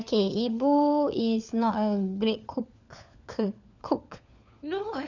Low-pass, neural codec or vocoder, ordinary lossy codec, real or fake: 7.2 kHz; codec, 16 kHz, 4 kbps, FunCodec, trained on LibriTTS, 50 frames a second; none; fake